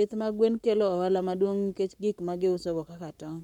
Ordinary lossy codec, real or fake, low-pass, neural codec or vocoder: none; fake; 19.8 kHz; codec, 44.1 kHz, 7.8 kbps, Pupu-Codec